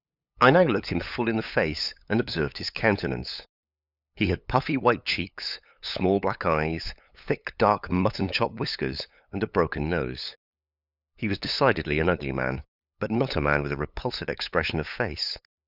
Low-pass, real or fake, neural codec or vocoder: 5.4 kHz; fake; codec, 16 kHz, 8 kbps, FunCodec, trained on LibriTTS, 25 frames a second